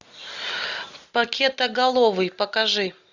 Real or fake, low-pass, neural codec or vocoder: real; 7.2 kHz; none